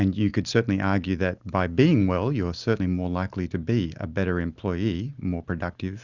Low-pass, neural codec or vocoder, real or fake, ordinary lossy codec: 7.2 kHz; none; real; Opus, 64 kbps